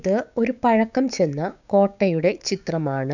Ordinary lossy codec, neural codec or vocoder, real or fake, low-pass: none; codec, 16 kHz, 6 kbps, DAC; fake; 7.2 kHz